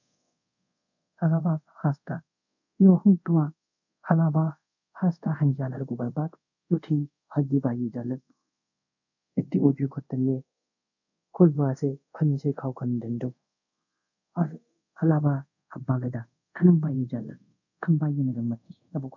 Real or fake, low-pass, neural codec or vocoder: fake; 7.2 kHz; codec, 24 kHz, 0.5 kbps, DualCodec